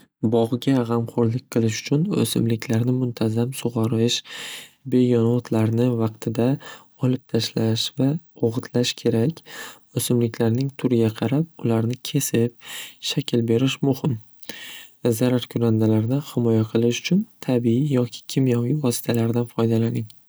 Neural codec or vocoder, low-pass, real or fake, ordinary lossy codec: none; none; real; none